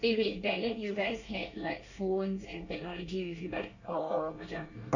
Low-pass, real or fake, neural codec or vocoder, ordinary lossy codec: 7.2 kHz; fake; codec, 24 kHz, 1 kbps, SNAC; none